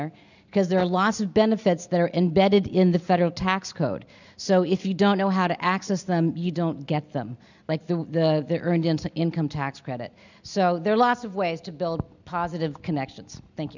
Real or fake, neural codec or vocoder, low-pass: real; none; 7.2 kHz